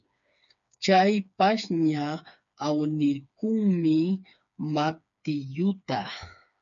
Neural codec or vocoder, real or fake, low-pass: codec, 16 kHz, 4 kbps, FreqCodec, smaller model; fake; 7.2 kHz